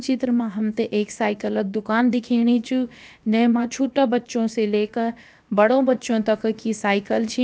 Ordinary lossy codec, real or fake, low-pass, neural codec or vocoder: none; fake; none; codec, 16 kHz, about 1 kbps, DyCAST, with the encoder's durations